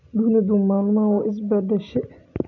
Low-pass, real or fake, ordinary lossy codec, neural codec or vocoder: 7.2 kHz; real; none; none